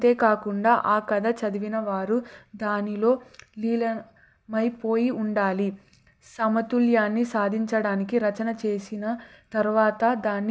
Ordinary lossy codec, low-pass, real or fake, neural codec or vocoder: none; none; real; none